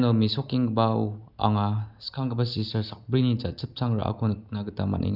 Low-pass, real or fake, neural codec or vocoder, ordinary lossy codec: 5.4 kHz; real; none; none